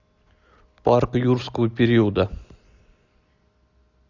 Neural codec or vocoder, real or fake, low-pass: none; real; 7.2 kHz